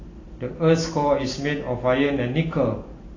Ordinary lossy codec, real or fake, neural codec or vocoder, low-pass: AAC, 32 kbps; real; none; 7.2 kHz